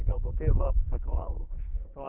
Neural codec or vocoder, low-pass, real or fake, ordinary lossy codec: codec, 44.1 kHz, 2.6 kbps, SNAC; 3.6 kHz; fake; Opus, 24 kbps